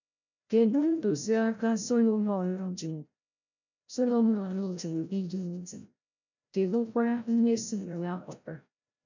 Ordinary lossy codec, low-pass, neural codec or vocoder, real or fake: none; 7.2 kHz; codec, 16 kHz, 0.5 kbps, FreqCodec, larger model; fake